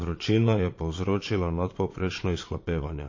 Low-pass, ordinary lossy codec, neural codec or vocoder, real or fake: 7.2 kHz; MP3, 32 kbps; vocoder, 22.05 kHz, 80 mel bands, Vocos; fake